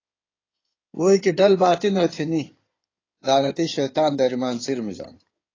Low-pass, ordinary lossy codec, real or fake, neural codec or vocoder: 7.2 kHz; AAC, 32 kbps; fake; codec, 16 kHz in and 24 kHz out, 2.2 kbps, FireRedTTS-2 codec